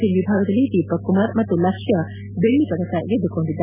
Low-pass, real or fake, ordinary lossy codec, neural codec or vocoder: 3.6 kHz; real; none; none